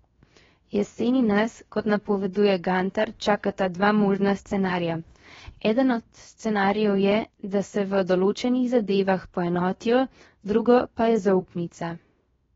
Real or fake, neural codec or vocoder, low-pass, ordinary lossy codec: fake; codec, 16 kHz, 0.7 kbps, FocalCodec; 7.2 kHz; AAC, 24 kbps